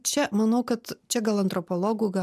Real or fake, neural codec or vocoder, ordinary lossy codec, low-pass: real; none; MP3, 96 kbps; 14.4 kHz